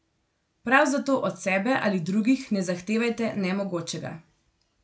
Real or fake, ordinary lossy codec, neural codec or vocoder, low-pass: real; none; none; none